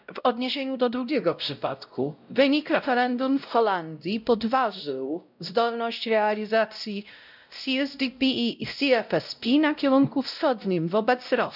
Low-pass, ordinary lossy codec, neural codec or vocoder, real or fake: 5.4 kHz; none; codec, 16 kHz, 0.5 kbps, X-Codec, WavLM features, trained on Multilingual LibriSpeech; fake